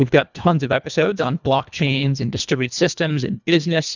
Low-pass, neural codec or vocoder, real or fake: 7.2 kHz; codec, 24 kHz, 1.5 kbps, HILCodec; fake